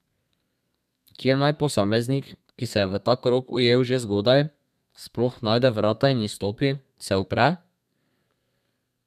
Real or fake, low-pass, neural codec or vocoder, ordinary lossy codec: fake; 14.4 kHz; codec, 32 kHz, 1.9 kbps, SNAC; none